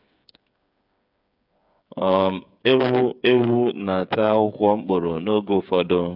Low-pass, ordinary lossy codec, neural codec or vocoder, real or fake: 5.4 kHz; none; codec, 16 kHz, 8 kbps, FreqCodec, smaller model; fake